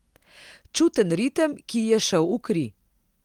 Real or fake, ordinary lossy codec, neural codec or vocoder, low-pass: real; Opus, 24 kbps; none; 19.8 kHz